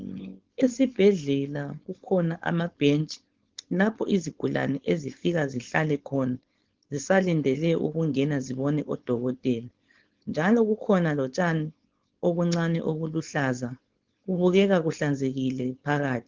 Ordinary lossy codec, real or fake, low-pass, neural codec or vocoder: Opus, 16 kbps; fake; 7.2 kHz; codec, 16 kHz, 4.8 kbps, FACodec